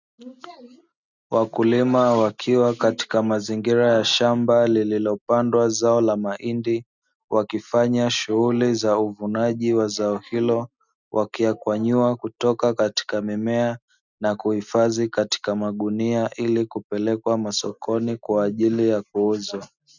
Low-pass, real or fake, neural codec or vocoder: 7.2 kHz; real; none